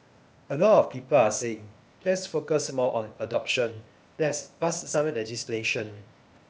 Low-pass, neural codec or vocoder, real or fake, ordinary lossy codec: none; codec, 16 kHz, 0.8 kbps, ZipCodec; fake; none